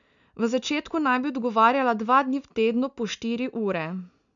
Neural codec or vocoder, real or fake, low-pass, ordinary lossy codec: none; real; 7.2 kHz; MP3, 96 kbps